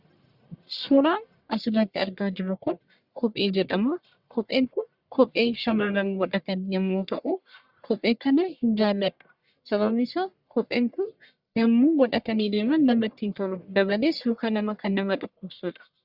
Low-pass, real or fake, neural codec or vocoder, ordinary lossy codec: 5.4 kHz; fake; codec, 44.1 kHz, 1.7 kbps, Pupu-Codec; Opus, 64 kbps